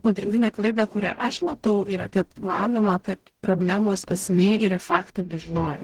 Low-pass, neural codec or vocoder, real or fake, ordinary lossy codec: 19.8 kHz; codec, 44.1 kHz, 0.9 kbps, DAC; fake; Opus, 16 kbps